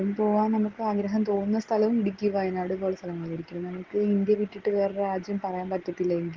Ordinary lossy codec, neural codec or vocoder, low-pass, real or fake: Opus, 16 kbps; none; 7.2 kHz; real